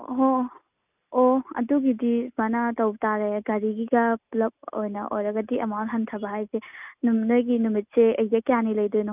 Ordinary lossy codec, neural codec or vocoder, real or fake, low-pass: none; none; real; 3.6 kHz